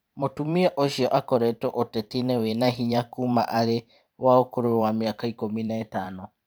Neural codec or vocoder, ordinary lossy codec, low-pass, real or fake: vocoder, 44.1 kHz, 128 mel bands every 512 samples, BigVGAN v2; none; none; fake